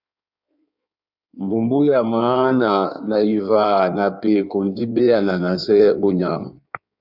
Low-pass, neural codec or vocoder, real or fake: 5.4 kHz; codec, 16 kHz in and 24 kHz out, 1.1 kbps, FireRedTTS-2 codec; fake